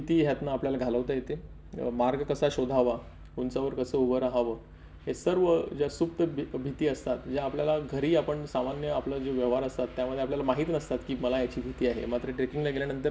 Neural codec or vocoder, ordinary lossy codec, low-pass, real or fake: none; none; none; real